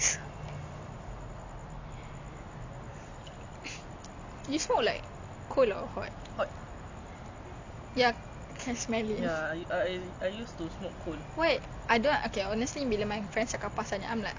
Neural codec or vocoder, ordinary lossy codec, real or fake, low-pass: none; MP3, 64 kbps; real; 7.2 kHz